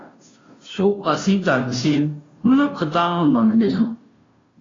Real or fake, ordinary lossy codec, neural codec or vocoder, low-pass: fake; AAC, 32 kbps; codec, 16 kHz, 0.5 kbps, FunCodec, trained on Chinese and English, 25 frames a second; 7.2 kHz